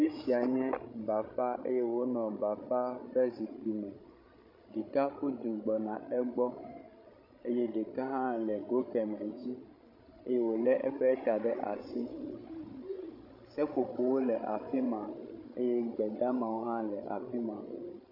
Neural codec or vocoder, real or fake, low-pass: codec, 16 kHz, 16 kbps, FreqCodec, larger model; fake; 5.4 kHz